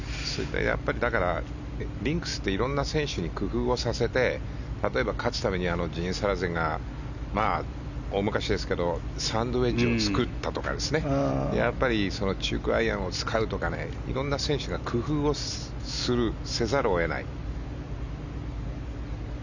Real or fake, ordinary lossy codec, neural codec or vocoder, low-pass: real; none; none; 7.2 kHz